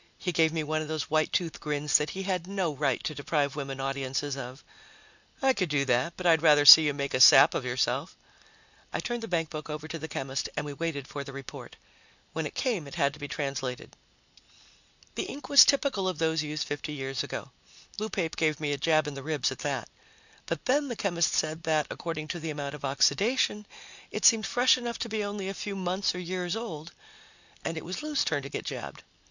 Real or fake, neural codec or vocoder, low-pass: real; none; 7.2 kHz